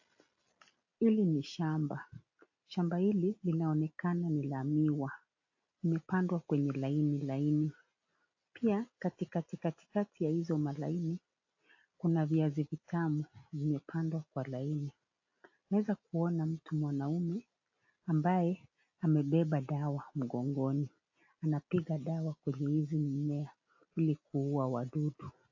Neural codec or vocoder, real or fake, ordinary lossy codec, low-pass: none; real; AAC, 48 kbps; 7.2 kHz